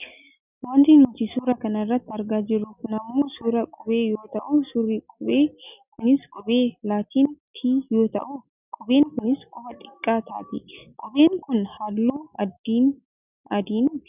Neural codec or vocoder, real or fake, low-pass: none; real; 3.6 kHz